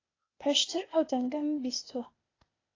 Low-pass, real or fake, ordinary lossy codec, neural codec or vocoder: 7.2 kHz; fake; AAC, 32 kbps; codec, 16 kHz, 0.8 kbps, ZipCodec